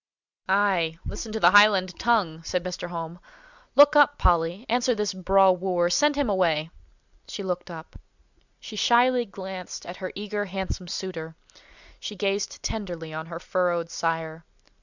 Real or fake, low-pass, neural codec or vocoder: real; 7.2 kHz; none